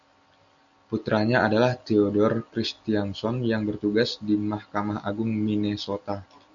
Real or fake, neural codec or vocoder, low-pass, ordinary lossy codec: real; none; 7.2 kHz; MP3, 96 kbps